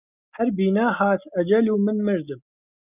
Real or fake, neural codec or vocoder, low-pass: real; none; 3.6 kHz